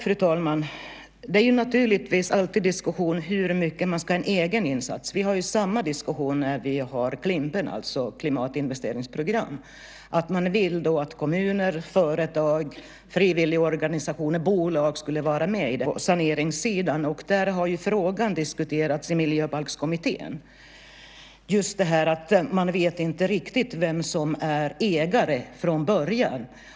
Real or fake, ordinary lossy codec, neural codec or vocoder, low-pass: real; none; none; none